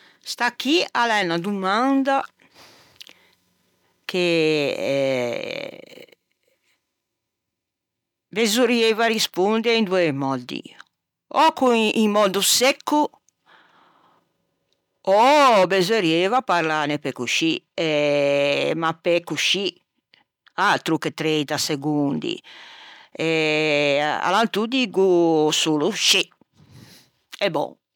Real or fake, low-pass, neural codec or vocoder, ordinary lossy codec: real; 19.8 kHz; none; none